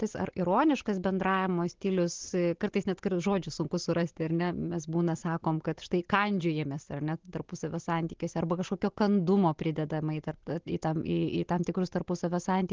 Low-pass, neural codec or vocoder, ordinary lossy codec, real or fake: 7.2 kHz; none; Opus, 16 kbps; real